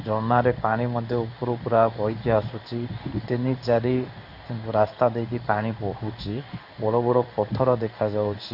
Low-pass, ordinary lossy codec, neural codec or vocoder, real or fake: 5.4 kHz; AAC, 48 kbps; codec, 16 kHz in and 24 kHz out, 1 kbps, XY-Tokenizer; fake